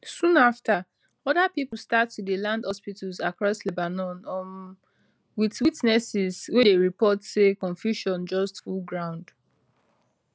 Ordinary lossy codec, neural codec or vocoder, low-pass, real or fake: none; none; none; real